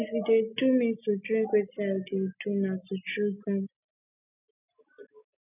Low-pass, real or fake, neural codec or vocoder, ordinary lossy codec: 3.6 kHz; real; none; none